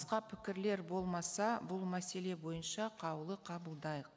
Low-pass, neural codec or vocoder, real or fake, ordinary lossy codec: none; none; real; none